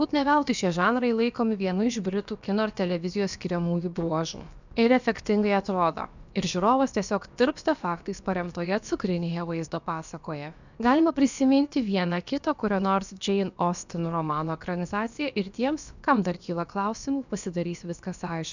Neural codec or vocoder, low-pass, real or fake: codec, 16 kHz, about 1 kbps, DyCAST, with the encoder's durations; 7.2 kHz; fake